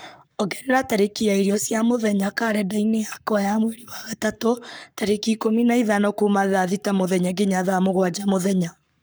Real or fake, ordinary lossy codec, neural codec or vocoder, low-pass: fake; none; codec, 44.1 kHz, 7.8 kbps, Pupu-Codec; none